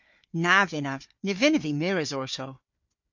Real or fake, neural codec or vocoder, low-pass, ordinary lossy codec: fake; codec, 16 kHz, 4 kbps, FunCodec, trained on Chinese and English, 50 frames a second; 7.2 kHz; MP3, 48 kbps